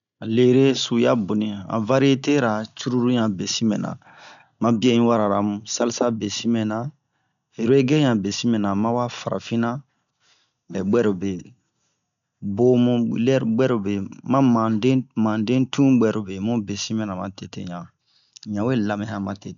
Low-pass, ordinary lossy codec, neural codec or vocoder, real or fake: 7.2 kHz; none; none; real